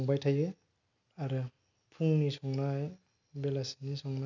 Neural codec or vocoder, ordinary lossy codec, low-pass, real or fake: none; none; 7.2 kHz; real